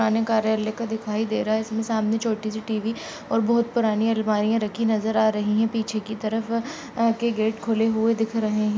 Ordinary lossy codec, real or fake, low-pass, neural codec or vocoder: none; real; none; none